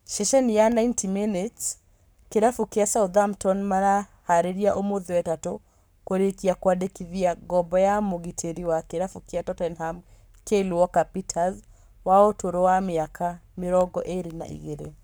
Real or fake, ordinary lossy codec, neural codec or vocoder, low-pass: fake; none; codec, 44.1 kHz, 7.8 kbps, Pupu-Codec; none